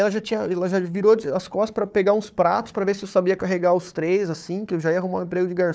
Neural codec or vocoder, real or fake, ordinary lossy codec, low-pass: codec, 16 kHz, 4 kbps, FunCodec, trained on Chinese and English, 50 frames a second; fake; none; none